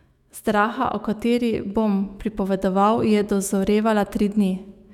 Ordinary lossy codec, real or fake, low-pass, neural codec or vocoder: none; fake; 19.8 kHz; autoencoder, 48 kHz, 128 numbers a frame, DAC-VAE, trained on Japanese speech